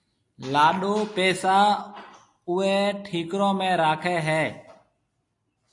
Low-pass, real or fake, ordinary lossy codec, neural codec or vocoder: 10.8 kHz; real; AAC, 48 kbps; none